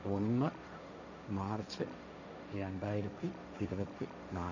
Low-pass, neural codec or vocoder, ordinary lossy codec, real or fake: 7.2 kHz; codec, 16 kHz, 1.1 kbps, Voila-Tokenizer; none; fake